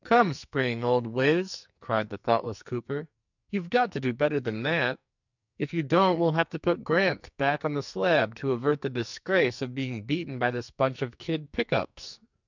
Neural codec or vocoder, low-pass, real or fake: codec, 44.1 kHz, 2.6 kbps, SNAC; 7.2 kHz; fake